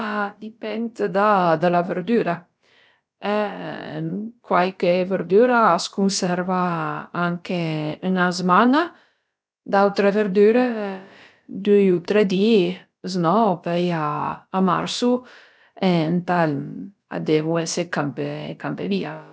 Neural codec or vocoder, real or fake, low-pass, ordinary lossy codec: codec, 16 kHz, about 1 kbps, DyCAST, with the encoder's durations; fake; none; none